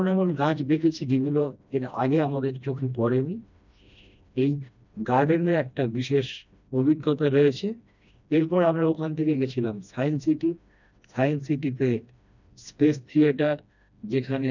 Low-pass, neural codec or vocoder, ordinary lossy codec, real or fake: 7.2 kHz; codec, 16 kHz, 1 kbps, FreqCodec, smaller model; none; fake